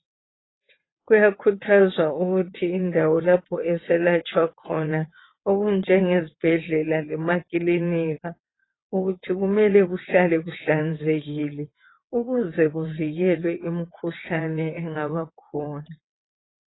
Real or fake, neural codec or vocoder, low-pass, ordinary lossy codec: fake; vocoder, 22.05 kHz, 80 mel bands, WaveNeXt; 7.2 kHz; AAC, 16 kbps